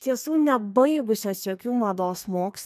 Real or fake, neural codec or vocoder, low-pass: fake; codec, 32 kHz, 1.9 kbps, SNAC; 14.4 kHz